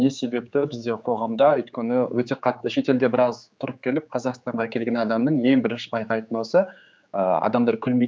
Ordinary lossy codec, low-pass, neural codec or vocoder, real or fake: none; none; codec, 16 kHz, 4 kbps, X-Codec, HuBERT features, trained on general audio; fake